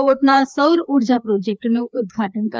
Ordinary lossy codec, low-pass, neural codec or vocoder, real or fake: none; none; codec, 16 kHz, 2 kbps, FreqCodec, larger model; fake